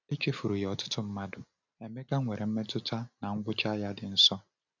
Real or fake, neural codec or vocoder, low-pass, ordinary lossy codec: real; none; 7.2 kHz; none